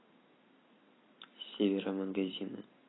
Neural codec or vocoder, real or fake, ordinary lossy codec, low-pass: vocoder, 44.1 kHz, 128 mel bands every 256 samples, BigVGAN v2; fake; AAC, 16 kbps; 7.2 kHz